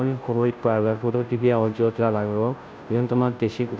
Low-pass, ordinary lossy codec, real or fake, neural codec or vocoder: none; none; fake; codec, 16 kHz, 0.5 kbps, FunCodec, trained on Chinese and English, 25 frames a second